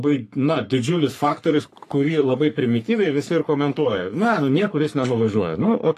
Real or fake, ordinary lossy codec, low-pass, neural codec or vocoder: fake; AAC, 64 kbps; 14.4 kHz; codec, 44.1 kHz, 3.4 kbps, Pupu-Codec